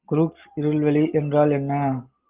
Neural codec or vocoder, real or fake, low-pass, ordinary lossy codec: none; real; 3.6 kHz; Opus, 32 kbps